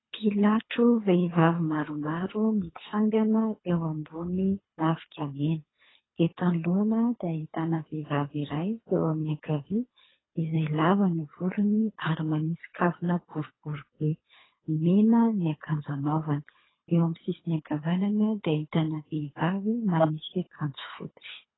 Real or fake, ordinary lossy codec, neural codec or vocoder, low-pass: fake; AAC, 16 kbps; codec, 24 kHz, 3 kbps, HILCodec; 7.2 kHz